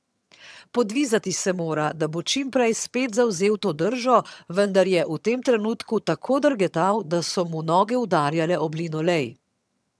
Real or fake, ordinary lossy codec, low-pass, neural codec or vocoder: fake; none; none; vocoder, 22.05 kHz, 80 mel bands, HiFi-GAN